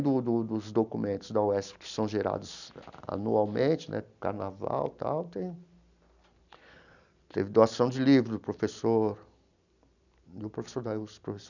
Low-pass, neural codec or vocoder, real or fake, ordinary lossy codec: 7.2 kHz; none; real; none